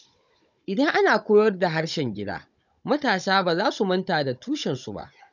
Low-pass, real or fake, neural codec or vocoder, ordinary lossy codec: 7.2 kHz; fake; codec, 16 kHz, 16 kbps, FunCodec, trained on Chinese and English, 50 frames a second; none